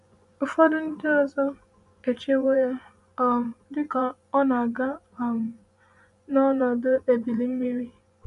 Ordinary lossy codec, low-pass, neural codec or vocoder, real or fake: none; 10.8 kHz; vocoder, 24 kHz, 100 mel bands, Vocos; fake